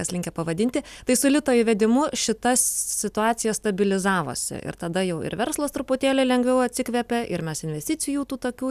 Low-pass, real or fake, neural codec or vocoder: 14.4 kHz; real; none